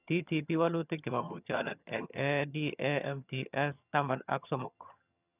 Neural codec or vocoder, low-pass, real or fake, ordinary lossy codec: vocoder, 22.05 kHz, 80 mel bands, HiFi-GAN; 3.6 kHz; fake; none